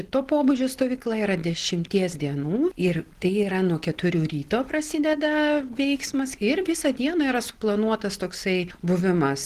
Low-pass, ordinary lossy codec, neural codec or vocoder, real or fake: 14.4 kHz; Opus, 16 kbps; none; real